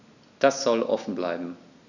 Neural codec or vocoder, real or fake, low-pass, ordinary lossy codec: none; real; 7.2 kHz; none